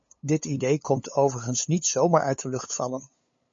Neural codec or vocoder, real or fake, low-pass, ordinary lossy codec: codec, 16 kHz, 8 kbps, FunCodec, trained on LibriTTS, 25 frames a second; fake; 7.2 kHz; MP3, 32 kbps